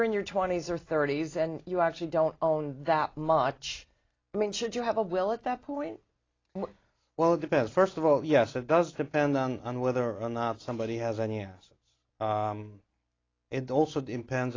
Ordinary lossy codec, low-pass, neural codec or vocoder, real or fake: AAC, 32 kbps; 7.2 kHz; none; real